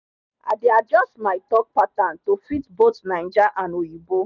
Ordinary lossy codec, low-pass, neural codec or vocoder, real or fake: none; 7.2 kHz; none; real